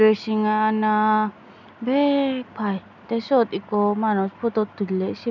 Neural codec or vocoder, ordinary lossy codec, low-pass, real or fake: none; none; 7.2 kHz; real